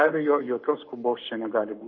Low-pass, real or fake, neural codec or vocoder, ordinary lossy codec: 7.2 kHz; fake; codec, 44.1 kHz, 7.8 kbps, Pupu-Codec; MP3, 32 kbps